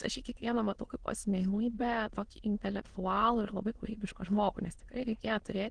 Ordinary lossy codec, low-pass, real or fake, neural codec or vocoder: Opus, 16 kbps; 9.9 kHz; fake; autoencoder, 22.05 kHz, a latent of 192 numbers a frame, VITS, trained on many speakers